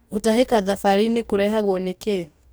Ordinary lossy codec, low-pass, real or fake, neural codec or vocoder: none; none; fake; codec, 44.1 kHz, 2.6 kbps, DAC